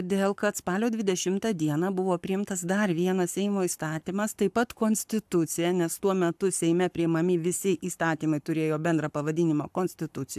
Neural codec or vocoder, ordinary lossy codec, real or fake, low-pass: codec, 44.1 kHz, 7.8 kbps, Pupu-Codec; AAC, 96 kbps; fake; 14.4 kHz